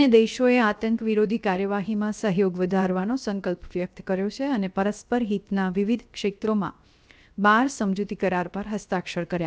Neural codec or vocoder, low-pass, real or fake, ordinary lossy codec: codec, 16 kHz, 0.7 kbps, FocalCodec; none; fake; none